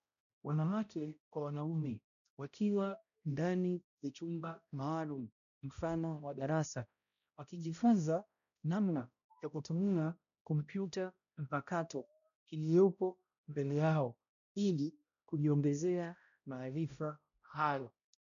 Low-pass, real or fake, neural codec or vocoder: 7.2 kHz; fake; codec, 16 kHz, 0.5 kbps, X-Codec, HuBERT features, trained on balanced general audio